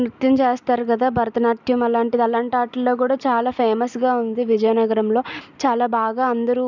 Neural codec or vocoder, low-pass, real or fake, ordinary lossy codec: none; 7.2 kHz; real; none